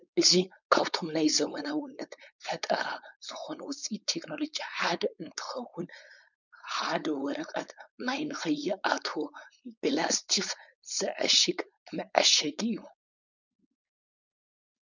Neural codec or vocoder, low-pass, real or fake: codec, 16 kHz, 4.8 kbps, FACodec; 7.2 kHz; fake